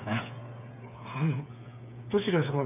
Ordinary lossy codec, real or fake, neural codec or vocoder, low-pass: none; fake; codec, 16 kHz, 8 kbps, FreqCodec, smaller model; 3.6 kHz